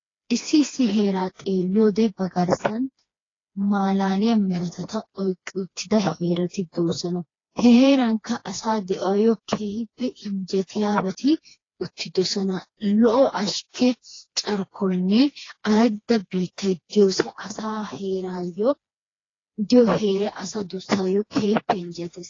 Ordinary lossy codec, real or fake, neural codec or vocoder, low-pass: AAC, 32 kbps; fake; codec, 16 kHz, 2 kbps, FreqCodec, smaller model; 7.2 kHz